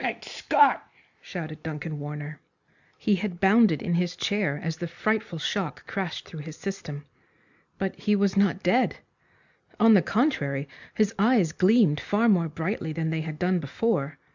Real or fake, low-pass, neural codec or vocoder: real; 7.2 kHz; none